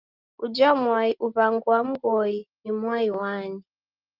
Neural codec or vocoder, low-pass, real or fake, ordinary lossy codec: none; 5.4 kHz; real; Opus, 32 kbps